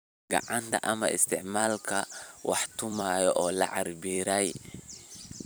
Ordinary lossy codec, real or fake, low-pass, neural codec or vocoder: none; fake; none; vocoder, 44.1 kHz, 128 mel bands every 256 samples, BigVGAN v2